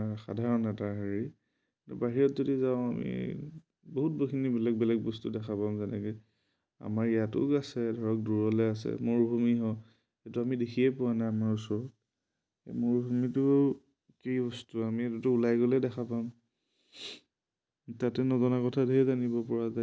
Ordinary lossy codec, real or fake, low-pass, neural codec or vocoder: none; real; none; none